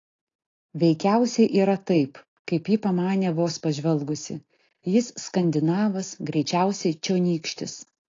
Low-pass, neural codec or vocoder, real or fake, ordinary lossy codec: 7.2 kHz; none; real; AAC, 32 kbps